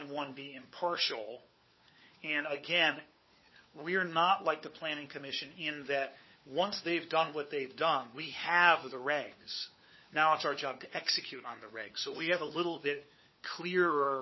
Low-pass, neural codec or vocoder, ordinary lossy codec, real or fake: 7.2 kHz; codec, 16 kHz, 4 kbps, FunCodec, trained on LibriTTS, 50 frames a second; MP3, 24 kbps; fake